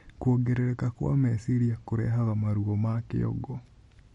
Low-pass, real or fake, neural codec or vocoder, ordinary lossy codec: 10.8 kHz; real; none; MP3, 48 kbps